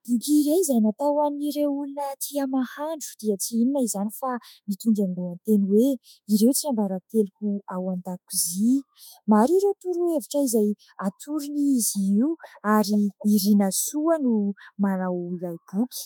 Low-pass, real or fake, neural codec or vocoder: 19.8 kHz; fake; autoencoder, 48 kHz, 32 numbers a frame, DAC-VAE, trained on Japanese speech